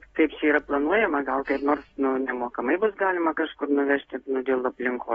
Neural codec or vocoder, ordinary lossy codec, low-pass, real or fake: none; AAC, 24 kbps; 19.8 kHz; real